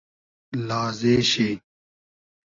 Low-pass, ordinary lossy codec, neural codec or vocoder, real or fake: 7.2 kHz; AAC, 32 kbps; none; real